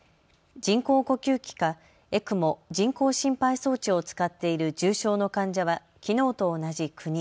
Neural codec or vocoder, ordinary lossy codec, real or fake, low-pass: none; none; real; none